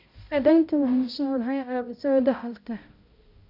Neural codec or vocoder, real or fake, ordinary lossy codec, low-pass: codec, 16 kHz, 0.5 kbps, X-Codec, HuBERT features, trained on balanced general audio; fake; none; 5.4 kHz